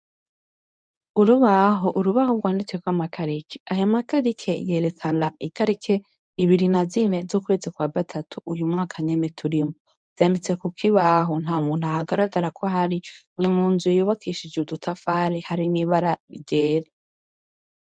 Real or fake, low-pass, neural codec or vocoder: fake; 9.9 kHz; codec, 24 kHz, 0.9 kbps, WavTokenizer, medium speech release version 1